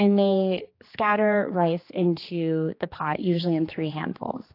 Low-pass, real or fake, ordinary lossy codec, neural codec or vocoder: 5.4 kHz; fake; AAC, 32 kbps; codec, 16 kHz, 2 kbps, X-Codec, HuBERT features, trained on general audio